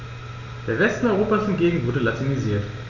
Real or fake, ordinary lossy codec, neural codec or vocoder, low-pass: real; none; none; 7.2 kHz